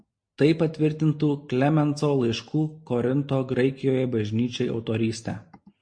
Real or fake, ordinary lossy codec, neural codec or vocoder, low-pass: real; MP3, 48 kbps; none; 9.9 kHz